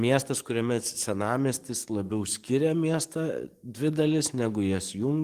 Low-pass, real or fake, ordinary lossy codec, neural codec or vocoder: 14.4 kHz; fake; Opus, 24 kbps; codec, 44.1 kHz, 7.8 kbps, DAC